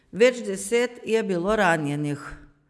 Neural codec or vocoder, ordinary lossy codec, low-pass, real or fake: none; none; none; real